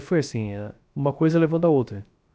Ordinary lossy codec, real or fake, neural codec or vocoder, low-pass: none; fake; codec, 16 kHz, 0.3 kbps, FocalCodec; none